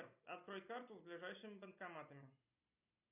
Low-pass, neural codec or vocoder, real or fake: 3.6 kHz; none; real